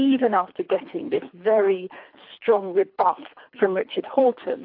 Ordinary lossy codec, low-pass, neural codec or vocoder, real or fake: AAC, 48 kbps; 5.4 kHz; codec, 24 kHz, 3 kbps, HILCodec; fake